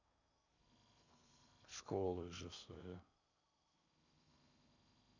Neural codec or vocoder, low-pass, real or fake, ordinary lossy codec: codec, 16 kHz in and 24 kHz out, 0.8 kbps, FocalCodec, streaming, 65536 codes; 7.2 kHz; fake; none